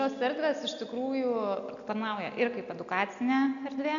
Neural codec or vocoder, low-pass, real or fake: none; 7.2 kHz; real